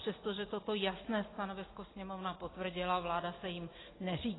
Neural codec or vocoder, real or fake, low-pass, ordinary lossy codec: none; real; 7.2 kHz; AAC, 16 kbps